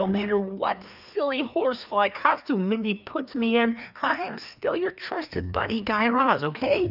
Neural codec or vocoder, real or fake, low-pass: codec, 16 kHz, 2 kbps, FreqCodec, larger model; fake; 5.4 kHz